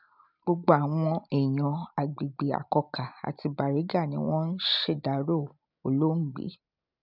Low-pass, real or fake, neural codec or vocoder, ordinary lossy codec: 5.4 kHz; real; none; none